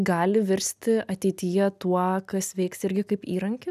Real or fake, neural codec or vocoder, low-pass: real; none; 14.4 kHz